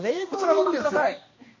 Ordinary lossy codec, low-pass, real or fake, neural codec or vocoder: MP3, 32 kbps; 7.2 kHz; fake; codec, 16 kHz, 1 kbps, X-Codec, HuBERT features, trained on balanced general audio